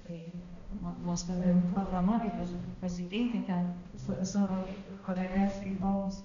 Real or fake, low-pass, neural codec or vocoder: fake; 7.2 kHz; codec, 16 kHz, 1 kbps, X-Codec, HuBERT features, trained on balanced general audio